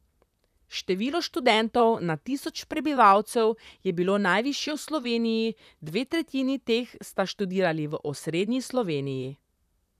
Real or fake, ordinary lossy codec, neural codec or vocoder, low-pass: fake; none; vocoder, 44.1 kHz, 128 mel bands, Pupu-Vocoder; 14.4 kHz